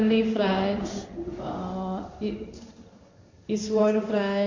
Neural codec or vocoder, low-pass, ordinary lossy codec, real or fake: codec, 16 kHz in and 24 kHz out, 1 kbps, XY-Tokenizer; 7.2 kHz; MP3, 48 kbps; fake